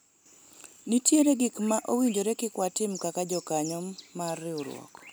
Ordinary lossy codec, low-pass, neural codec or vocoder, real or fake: none; none; none; real